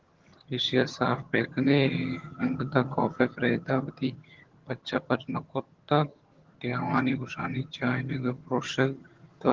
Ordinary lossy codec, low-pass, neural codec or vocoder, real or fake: Opus, 16 kbps; 7.2 kHz; vocoder, 22.05 kHz, 80 mel bands, HiFi-GAN; fake